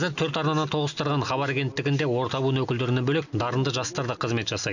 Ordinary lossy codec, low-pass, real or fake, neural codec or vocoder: none; 7.2 kHz; real; none